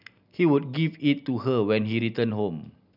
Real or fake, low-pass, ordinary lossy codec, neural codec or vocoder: real; 5.4 kHz; none; none